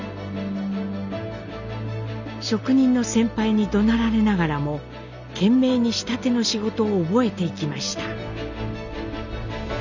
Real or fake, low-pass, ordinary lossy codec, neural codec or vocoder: real; 7.2 kHz; none; none